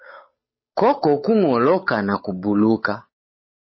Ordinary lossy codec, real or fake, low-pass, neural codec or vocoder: MP3, 24 kbps; fake; 7.2 kHz; codec, 16 kHz, 8 kbps, FunCodec, trained on Chinese and English, 25 frames a second